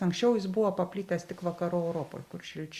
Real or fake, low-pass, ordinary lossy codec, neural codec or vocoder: real; 14.4 kHz; Opus, 64 kbps; none